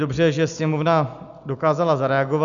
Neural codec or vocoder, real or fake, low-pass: none; real; 7.2 kHz